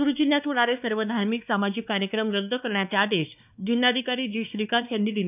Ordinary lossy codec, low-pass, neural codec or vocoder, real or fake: none; 3.6 kHz; codec, 16 kHz, 2 kbps, X-Codec, WavLM features, trained on Multilingual LibriSpeech; fake